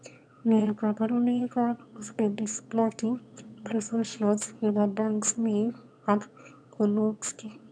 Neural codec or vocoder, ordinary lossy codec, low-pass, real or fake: autoencoder, 22.05 kHz, a latent of 192 numbers a frame, VITS, trained on one speaker; none; 9.9 kHz; fake